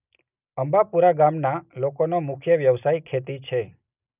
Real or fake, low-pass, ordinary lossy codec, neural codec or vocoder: real; 3.6 kHz; none; none